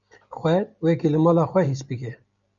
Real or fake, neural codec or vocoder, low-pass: real; none; 7.2 kHz